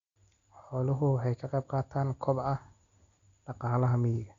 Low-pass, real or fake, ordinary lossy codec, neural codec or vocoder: 7.2 kHz; real; Opus, 64 kbps; none